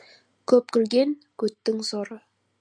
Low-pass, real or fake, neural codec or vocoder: 9.9 kHz; real; none